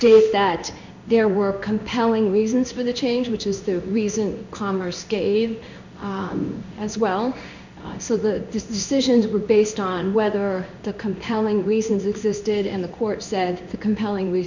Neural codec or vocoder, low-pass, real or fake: codec, 16 kHz in and 24 kHz out, 1 kbps, XY-Tokenizer; 7.2 kHz; fake